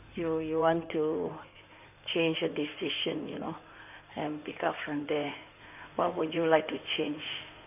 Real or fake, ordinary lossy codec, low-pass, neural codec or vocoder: fake; none; 3.6 kHz; codec, 16 kHz in and 24 kHz out, 2.2 kbps, FireRedTTS-2 codec